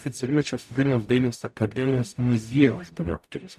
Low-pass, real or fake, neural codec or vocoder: 14.4 kHz; fake; codec, 44.1 kHz, 0.9 kbps, DAC